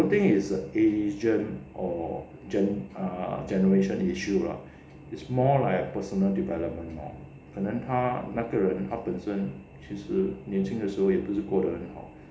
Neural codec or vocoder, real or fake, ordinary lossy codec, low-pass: none; real; none; none